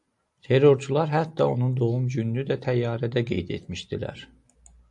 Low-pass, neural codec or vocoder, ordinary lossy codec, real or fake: 10.8 kHz; none; AAC, 64 kbps; real